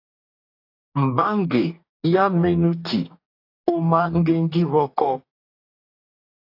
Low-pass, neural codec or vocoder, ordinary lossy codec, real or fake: 5.4 kHz; codec, 44.1 kHz, 2.6 kbps, DAC; AAC, 32 kbps; fake